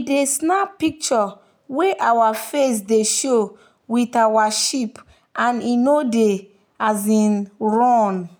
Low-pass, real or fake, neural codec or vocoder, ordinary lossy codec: none; real; none; none